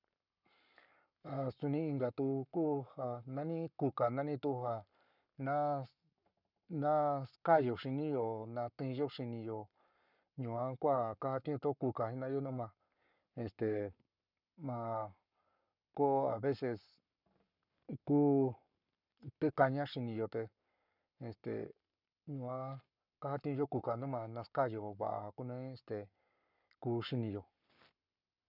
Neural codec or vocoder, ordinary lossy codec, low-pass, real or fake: codec, 44.1 kHz, 7.8 kbps, Pupu-Codec; none; 5.4 kHz; fake